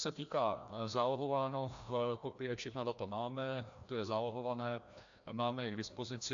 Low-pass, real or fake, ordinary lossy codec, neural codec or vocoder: 7.2 kHz; fake; AAC, 64 kbps; codec, 16 kHz, 1 kbps, FreqCodec, larger model